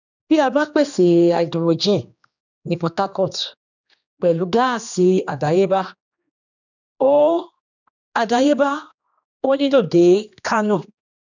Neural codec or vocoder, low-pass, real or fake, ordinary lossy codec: codec, 16 kHz, 2 kbps, X-Codec, HuBERT features, trained on general audio; 7.2 kHz; fake; none